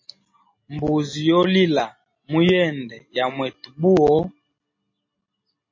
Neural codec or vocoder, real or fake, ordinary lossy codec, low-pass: none; real; MP3, 32 kbps; 7.2 kHz